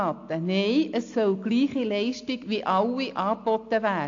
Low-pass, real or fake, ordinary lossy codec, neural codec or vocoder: 7.2 kHz; real; AAC, 48 kbps; none